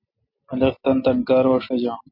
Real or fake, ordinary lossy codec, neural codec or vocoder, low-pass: real; MP3, 48 kbps; none; 5.4 kHz